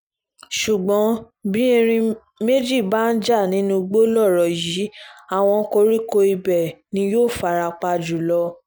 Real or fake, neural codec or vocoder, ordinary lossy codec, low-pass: real; none; none; none